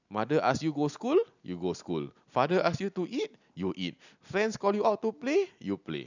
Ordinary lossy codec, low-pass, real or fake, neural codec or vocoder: none; 7.2 kHz; real; none